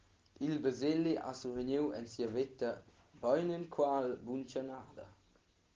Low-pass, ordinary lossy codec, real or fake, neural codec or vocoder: 7.2 kHz; Opus, 16 kbps; real; none